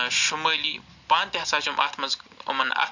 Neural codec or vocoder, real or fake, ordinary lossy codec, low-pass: none; real; none; 7.2 kHz